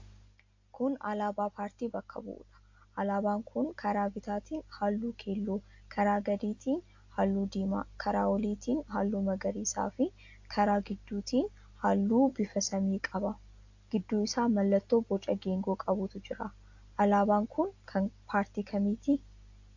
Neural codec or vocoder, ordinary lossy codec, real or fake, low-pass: none; AAC, 48 kbps; real; 7.2 kHz